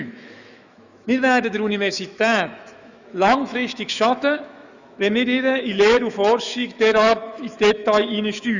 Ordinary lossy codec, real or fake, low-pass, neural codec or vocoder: none; fake; 7.2 kHz; autoencoder, 48 kHz, 128 numbers a frame, DAC-VAE, trained on Japanese speech